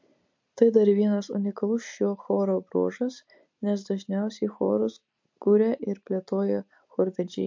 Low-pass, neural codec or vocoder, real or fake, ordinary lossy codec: 7.2 kHz; none; real; MP3, 48 kbps